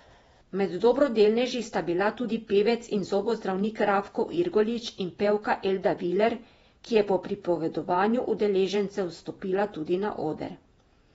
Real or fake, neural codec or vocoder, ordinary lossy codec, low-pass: fake; vocoder, 48 kHz, 128 mel bands, Vocos; AAC, 24 kbps; 19.8 kHz